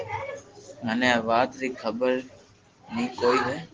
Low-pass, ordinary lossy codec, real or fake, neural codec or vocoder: 7.2 kHz; Opus, 16 kbps; real; none